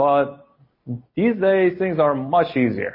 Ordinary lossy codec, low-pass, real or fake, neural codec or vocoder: MP3, 24 kbps; 5.4 kHz; real; none